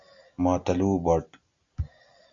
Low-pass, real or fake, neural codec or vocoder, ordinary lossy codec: 7.2 kHz; real; none; Opus, 64 kbps